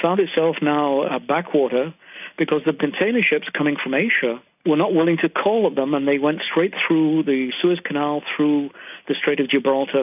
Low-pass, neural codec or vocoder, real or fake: 3.6 kHz; none; real